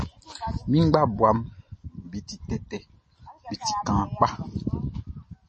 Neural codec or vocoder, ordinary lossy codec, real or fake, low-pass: none; MP3, 32 kbps; real; 10.8 kHz